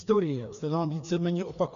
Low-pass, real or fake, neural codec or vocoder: 7.2 kHz; fake; codec, 16 kHz, 2 kbps, FreqCodec, larger model